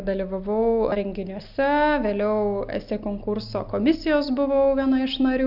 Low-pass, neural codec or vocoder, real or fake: 5.4 kHz; none; real